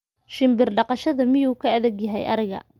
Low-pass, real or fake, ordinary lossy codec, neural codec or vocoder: 19.8 kHz; real; Opus, 32 kbps; none